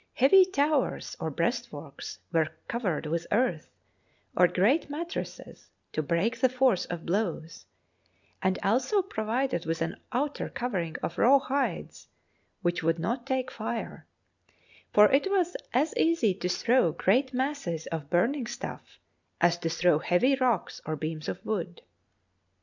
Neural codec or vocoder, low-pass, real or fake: none; 7.2 kHz; real